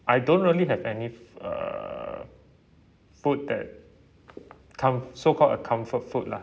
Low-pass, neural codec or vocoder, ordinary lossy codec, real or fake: none; none; none; real